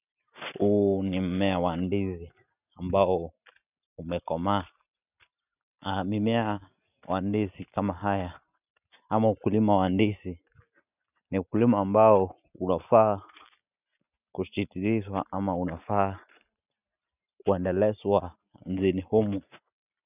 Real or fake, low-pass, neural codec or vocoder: real; 3.6 kHz; none